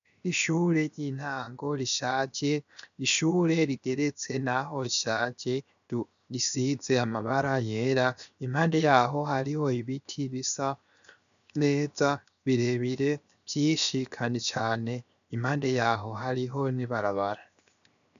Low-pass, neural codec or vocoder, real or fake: 7.2 kHz; codec, 16 kHz, 0.7 kbps, FocalCodec; fake